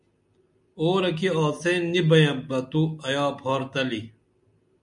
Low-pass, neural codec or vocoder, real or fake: 10.8 kHz; none; real